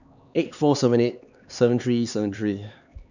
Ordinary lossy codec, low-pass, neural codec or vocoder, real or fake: none; 7.2 kHz; codec, 16 kHz, 4 kbps, X-Codec, HuBERT features, trained on LibriSpeech; fake